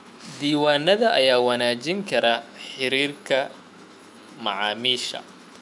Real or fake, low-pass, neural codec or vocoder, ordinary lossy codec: fake; 10.8 kHz; autoencoder, 48 kHz, 128 numbers a frame, DAC-VAE, trained on Japanese speech; none